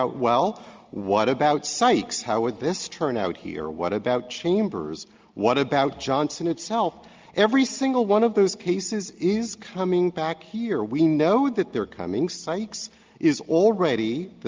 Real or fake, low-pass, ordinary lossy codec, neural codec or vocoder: real; 7.2 kHz; Opus, 32 kbps; none